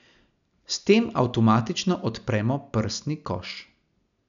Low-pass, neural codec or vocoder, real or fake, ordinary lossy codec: 7.2 kHz; none; real; none